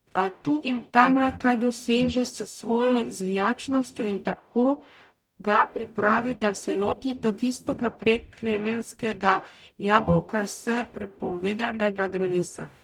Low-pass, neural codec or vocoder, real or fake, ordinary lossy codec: 19.8 kHz; codec, 44.1 kHz, 0.9 kbps, DAC; fake; none